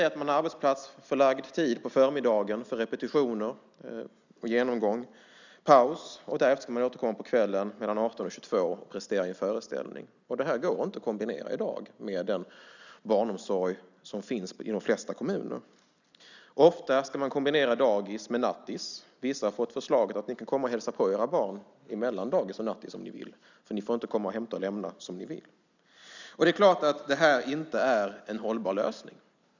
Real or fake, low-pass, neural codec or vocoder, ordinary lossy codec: real; 7.2 kHz; none; none